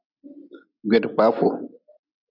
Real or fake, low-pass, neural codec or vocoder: real; 5.4 kHz; none